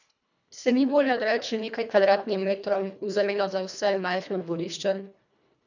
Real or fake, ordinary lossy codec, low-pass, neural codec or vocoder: fake; none; 7.2 kHz; codec, 24 kHz, 1.5 kbps, HILCodec